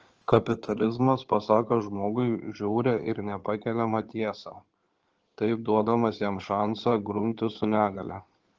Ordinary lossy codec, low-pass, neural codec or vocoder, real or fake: Opus, 16 kbps; 7.2 kHz; codec, 16 kHz in and 24 kHz out, 2.2 kbps, FireRedTTS-2 codec; fake